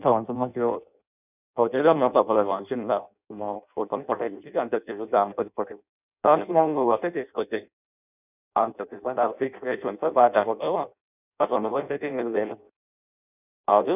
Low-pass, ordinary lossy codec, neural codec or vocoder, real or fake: 3.6 kHz; none; codec, 16 kHz in and 24 kHz out, 0.6 kbps, FireRedTTS-2 codec; fake